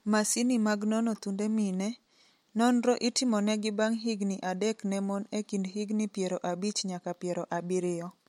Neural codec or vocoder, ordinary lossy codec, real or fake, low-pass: none; MP3, 64 kbps; real; 19.8 kHz